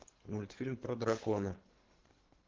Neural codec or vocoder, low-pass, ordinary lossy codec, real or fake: codec, 24 kHz, 3 kbps, HILCodec; 7.2 kHz; Opus, 16 kbps; fake